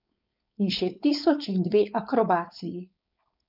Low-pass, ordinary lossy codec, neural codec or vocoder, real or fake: 5.4 kHz; none; codec, 16 kHz, 4.8 kbps, FACodec; fake